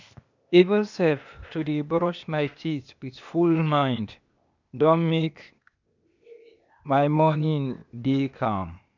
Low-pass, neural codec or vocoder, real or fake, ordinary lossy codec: 7.2 kHz; codec, 16 kHz, 0.8 kbps, ZipCodec; fake; none